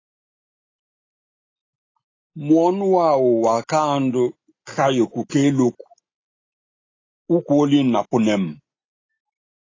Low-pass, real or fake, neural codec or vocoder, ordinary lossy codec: 7.2 kHz; real; none; AAC, 32 kbps